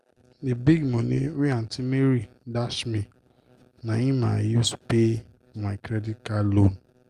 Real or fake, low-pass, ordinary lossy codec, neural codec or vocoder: real; 14.4 kHz; Opus, 32 kbps; none